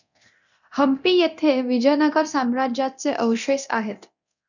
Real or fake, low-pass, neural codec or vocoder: fake; 7.2 kHz; codec, 24 kHz, 0.9 kbps, DualCodec